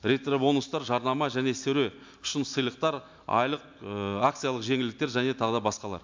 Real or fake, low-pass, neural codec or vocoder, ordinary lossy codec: real; 7.2 kHz; none; MP3, 64 kbps